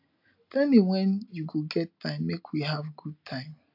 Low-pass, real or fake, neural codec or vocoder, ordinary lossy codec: 5.4 kHz; real; none; AAC, 48 kbps